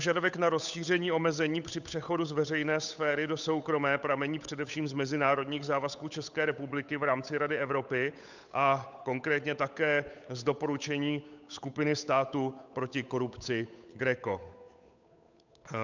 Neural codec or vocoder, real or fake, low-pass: codec, 16 kHz, 8 kbps, FunCodec, trained on Chinese and English, 25 frames a second; fake; 7.2 kHz